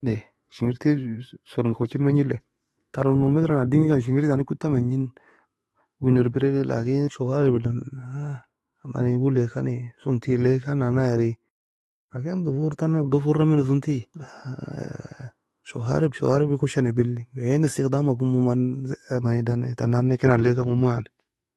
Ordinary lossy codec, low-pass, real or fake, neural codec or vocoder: AAC, 32 kbps; 19.8 kHz; fake; autoencoder, 48 kHz, 128 numbers a frame, DAC-VAE, trained on Japanese speech